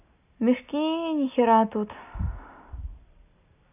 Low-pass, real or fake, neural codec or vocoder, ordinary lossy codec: 3.6 kHz; real; none; none